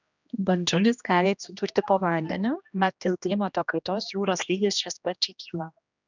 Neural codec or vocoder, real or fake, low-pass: codec, 16 kHz, 1 kbps, X-Codec, HuBERT features, trained on general audio; fake; 7.2 kHz